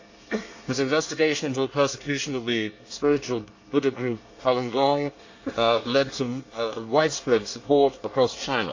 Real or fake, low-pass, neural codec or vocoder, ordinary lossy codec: fake; 7.2 kHz; codec, 24 kHz, 1 kbps, SNAC; AAC, 48 kbps